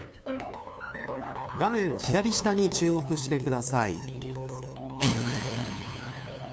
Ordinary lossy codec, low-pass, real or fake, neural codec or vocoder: none; none; fake; codec, 16 kHz, 2 kbps, FunCodec, trained on LibriTTS, 25 frames a second